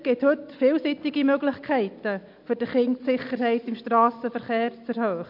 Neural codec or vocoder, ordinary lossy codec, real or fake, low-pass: none; MP3, 48 kbps; real; 5.4 kHz